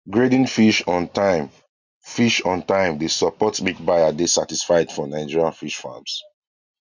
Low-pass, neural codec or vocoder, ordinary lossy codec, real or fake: 7.2 kHz; none; none; real